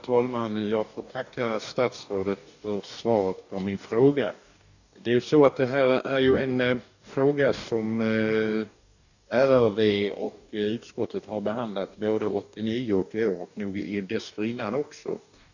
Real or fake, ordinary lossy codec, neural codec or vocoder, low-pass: fake; none; codec, 44.1 kHz, 2.6 kbps, DAC; 7.2 kHz